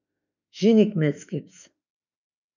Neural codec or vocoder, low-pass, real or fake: autoencoder, 48 kHz, 32 numbers a frame, DAC-VAE, trained on Japanese speech; 7.2 kHz; fake